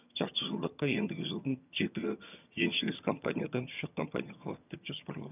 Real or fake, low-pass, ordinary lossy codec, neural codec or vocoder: fake; 3.6 kHz; none; vocoder, 22.05 kHz, 80 mel bands, HiFi-GAN